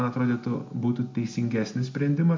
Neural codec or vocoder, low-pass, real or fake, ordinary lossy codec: none; 7.2 kHz; real; MP3, 48 kbps